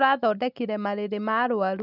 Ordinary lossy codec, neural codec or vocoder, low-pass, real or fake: none; none; 5.4 kHz; real